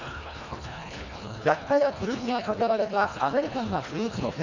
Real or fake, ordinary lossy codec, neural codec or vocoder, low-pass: fake; none; codec, 24 kHz, 1.5 kbps, HILCodec; 7.2 kHz